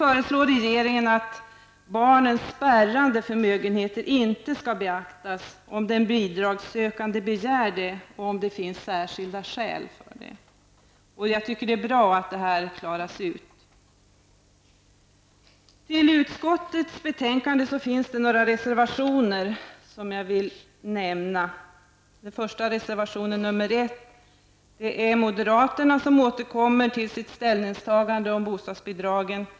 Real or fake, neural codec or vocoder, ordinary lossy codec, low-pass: real; none; none; none